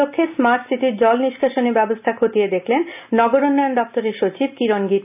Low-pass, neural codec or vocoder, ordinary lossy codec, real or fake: 3.6 kHz; none; none; real